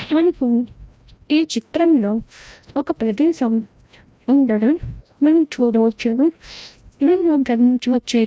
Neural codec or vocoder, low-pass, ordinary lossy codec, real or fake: codec, 16 kHz, 0.5 kbps, FreqCodec, larger model; none; none; fake